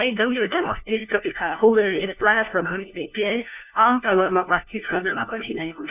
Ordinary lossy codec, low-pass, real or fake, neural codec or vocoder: none; 3.6 kHz; fake; codec, 16 kHz, 1 kbps, FunCodec, trained on LibriTTS, 50 frames a second